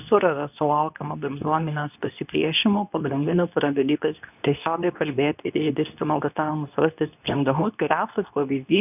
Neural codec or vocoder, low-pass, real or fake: codec, 24 kHz, 0.9 kbps, WavTokenizer, medium speech release version 2; 3.6 kHz; fake